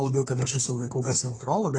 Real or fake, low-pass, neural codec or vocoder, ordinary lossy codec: fake; 9.9 kHz; codec, 24 kHz, 1 kbps, SNAC; AAC, 32 kbps